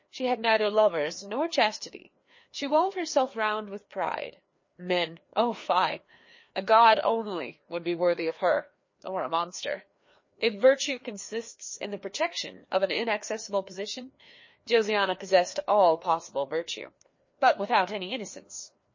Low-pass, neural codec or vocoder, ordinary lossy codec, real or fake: 7.2 kHz; codec, 16 kHz, 2 kbps, FreqCodec, larger model; MP3, 32 kbps; fake